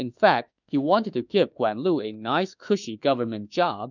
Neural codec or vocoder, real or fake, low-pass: autoencoder, 48 kHz, 32 numbers a frame, DAC-VAE, trained on Japanese speech; fake; 7.2 kHz